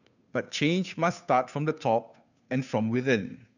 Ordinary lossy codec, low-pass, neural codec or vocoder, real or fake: none; 7.2 kHz; codec, 16 kHz, 2 kbps, FunCodec, trained on Chinese and English, 25 frames a second; fake